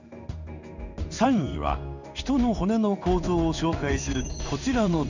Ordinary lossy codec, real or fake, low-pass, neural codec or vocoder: none; fake; 7.2 kHz; codec, 16 kHz in and 24 kHz out, 1 kbps, XY-Tokenizer